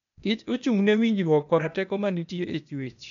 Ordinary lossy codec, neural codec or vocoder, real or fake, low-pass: none; codec, 16 kHz, 0.8 kbps, ZipCodec; fake; 7.2 kHz